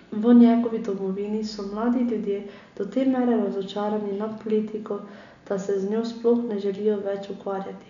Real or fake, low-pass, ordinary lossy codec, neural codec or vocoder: real; 7.2 kHz; none; none